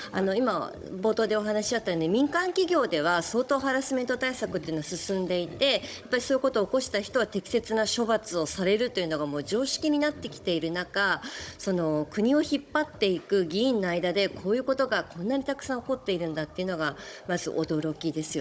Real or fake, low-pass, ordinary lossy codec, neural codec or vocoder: fake; none; none; codec, 16 kHz, 16 kbps, FunCodec, trained on Chinese and English, 50 frames a second